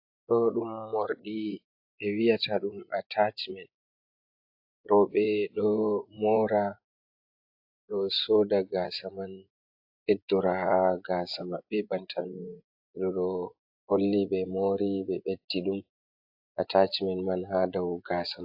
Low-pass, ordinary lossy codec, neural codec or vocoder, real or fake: 5.4 kHz; AAC, 48 kbps; vocoder, 24 kHz, 100 mel bands, Vocos; fake